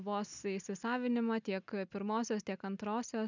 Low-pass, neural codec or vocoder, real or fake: 7.2 kHz; none; real